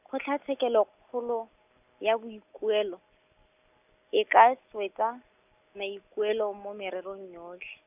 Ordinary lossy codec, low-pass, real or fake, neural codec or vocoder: none; 3.6 kHz; real; none